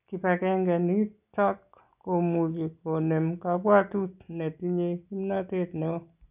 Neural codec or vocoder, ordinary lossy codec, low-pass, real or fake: none; none; 3.6 kHz; real